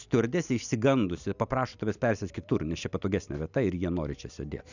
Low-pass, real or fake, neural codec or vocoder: 7.2 kHz; real; none